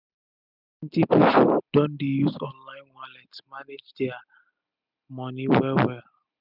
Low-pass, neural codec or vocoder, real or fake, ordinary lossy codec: 5.4 kHz; none; real; none